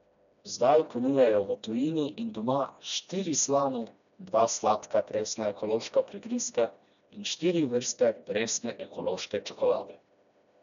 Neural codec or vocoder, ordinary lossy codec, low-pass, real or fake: codec, 16 kHz, 1 kbps, FreqCodec, smaller model; none; 7.2 kHz; fake